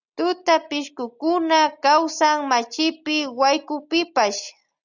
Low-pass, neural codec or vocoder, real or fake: 7.2 kHz; none; real